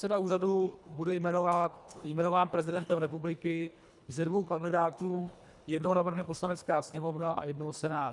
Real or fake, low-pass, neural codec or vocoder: fake; 10.8 kHz; codec, 24 kHz, 1.5 kbps, HILCodec